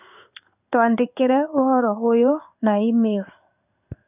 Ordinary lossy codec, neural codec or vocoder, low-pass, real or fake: none; codec, 16 kHz in and 24 kHz out, 1 kbps, XY-Tokenizer; 3.6 kHz; fake